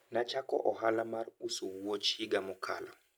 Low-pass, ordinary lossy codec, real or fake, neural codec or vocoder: none; none; real; none